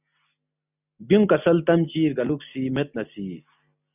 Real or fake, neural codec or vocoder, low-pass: real; none; 3.6 kHz